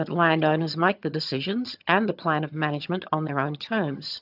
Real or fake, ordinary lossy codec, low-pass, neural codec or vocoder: fake; AAC, 48 kbps; 5.4 kHz; vocoder, 22.05 kHz, 80 mel bands, HiFi-GAN